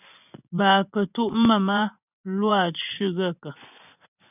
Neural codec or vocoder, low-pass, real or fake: vocoder, 24 kHz, 100 mel bands, Vocos; 3.6 kHz; fake